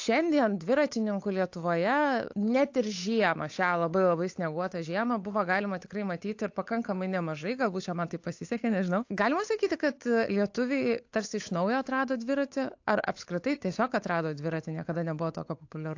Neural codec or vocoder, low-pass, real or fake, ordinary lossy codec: codec, 16 kHz, 8 kbps, FunCodec, trained on Chinese and English, 25 frames a second; 7.2 kHz; fake; AAC, 48 kbps